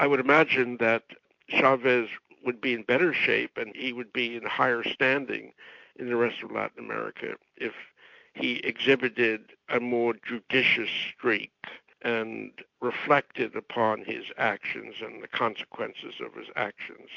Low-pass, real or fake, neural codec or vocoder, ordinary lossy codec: 7.2 kHz; real; none; MP3, 48 kbps